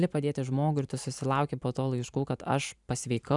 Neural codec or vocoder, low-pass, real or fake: vocoder, 44.1 kHz, 128 mel bands every 512 samples, BigVGAN v2; 10.8 kHz; fake